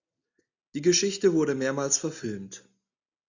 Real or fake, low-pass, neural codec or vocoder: real; 7.2 kHz; none